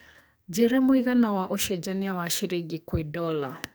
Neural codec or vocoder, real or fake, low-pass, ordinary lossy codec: codec, 44.1 kHz, 2.6 kbps, SNAC; fake; none; none